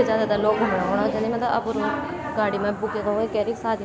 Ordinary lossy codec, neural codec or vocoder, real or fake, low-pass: none; none; real; none